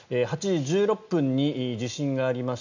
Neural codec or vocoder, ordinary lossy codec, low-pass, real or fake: none; none; 7.2 kHz; real